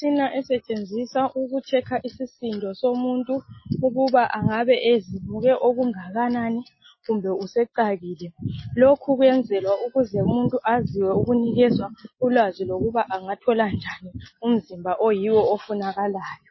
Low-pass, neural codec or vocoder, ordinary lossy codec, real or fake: 7.2 kHz; none; MP3, 24 kbps; real